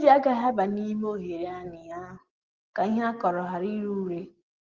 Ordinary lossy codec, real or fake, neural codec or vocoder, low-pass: Opus, 16 kbps; real; none; 7.2 kHz